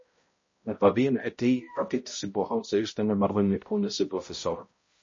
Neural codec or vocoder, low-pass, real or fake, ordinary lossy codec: codec, 16 kHz, 0.5 kbps, X-Codec, HuBERT features, trained on balanced general audio; 7.2 kHz; fake; MP3, 32 kbps